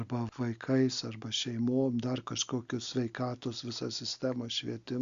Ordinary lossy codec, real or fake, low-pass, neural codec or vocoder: Opus, 64 kbps; real; 7.2 kHz; none